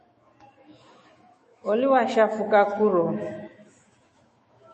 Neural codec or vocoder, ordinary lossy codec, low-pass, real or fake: autoencoder, 48 kHz, 128 numbers a frame, DAC-VAE, trained on Japanese speech; MP3, 32 kbps; 10.8 kHz; fake